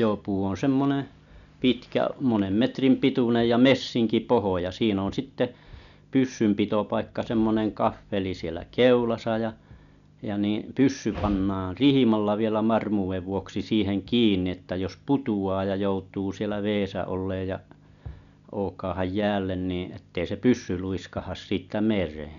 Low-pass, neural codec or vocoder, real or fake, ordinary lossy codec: 7.2 kHz; none; real; none